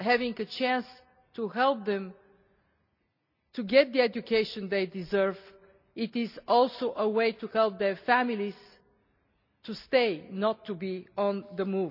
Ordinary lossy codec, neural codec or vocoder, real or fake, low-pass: none; none; real; 5.4 kHz